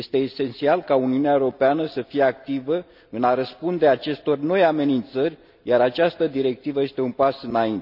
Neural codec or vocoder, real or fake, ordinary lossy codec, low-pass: none; real; none; 5.4 kHz